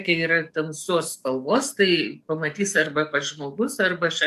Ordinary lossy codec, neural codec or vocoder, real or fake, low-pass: MP3, 96 kbps; codec, 44.1 kHz, 7.8 kbps, DAC; fake; 14.4 kHz